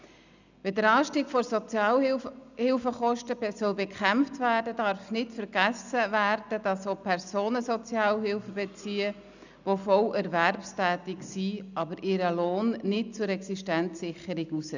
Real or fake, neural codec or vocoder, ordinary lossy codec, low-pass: real; none; none; 7.2 kHz